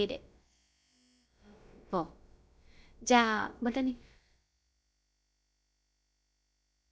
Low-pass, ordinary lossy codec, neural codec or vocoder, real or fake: none; none; codec, 16 kHz, about 1 kbps, DyCAST, with the encoder's durations; fake